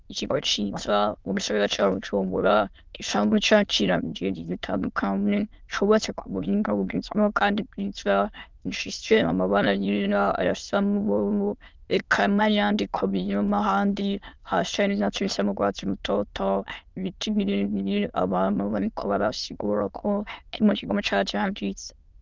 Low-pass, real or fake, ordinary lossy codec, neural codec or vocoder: 7.2 kHz; fake; Opus, 32 kbps; autoencoder, 22.05 kHz, a latent of 192 numbers a frame, VITS, trained on many speakers